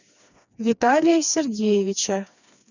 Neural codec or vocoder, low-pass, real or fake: codec, 16 kHz, 2 kbps, FreqCodec, smaller model; 7.2 kHz; fake